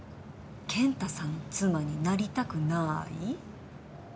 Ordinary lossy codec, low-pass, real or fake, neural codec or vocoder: none; none; real; none